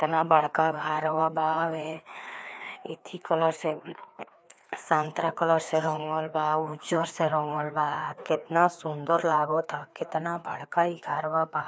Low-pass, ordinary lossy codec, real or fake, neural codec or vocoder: none; none; fake; codec, 16 kHz, 2 kbps, FreqCodec, larger model